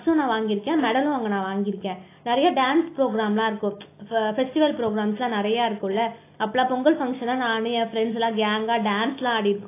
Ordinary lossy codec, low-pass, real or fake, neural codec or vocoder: AAC, 24 kbps; 3.6 kHz; real; none